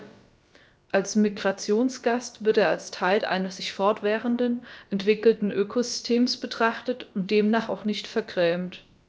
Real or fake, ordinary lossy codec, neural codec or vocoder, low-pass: fake; none; codec, 16 kHz, about 1 kbps, DyCAST, with the encoder's durations; none